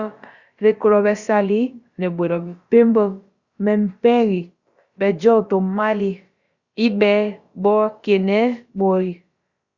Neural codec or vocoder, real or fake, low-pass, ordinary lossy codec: codec, 16 kHz, about 1 kbps, DyCAST, with the encoder's durations; fake; 7.2 kHz; Opus, 64 kbps